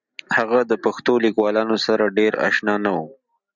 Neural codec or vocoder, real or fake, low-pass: none; real; 7.2 kHz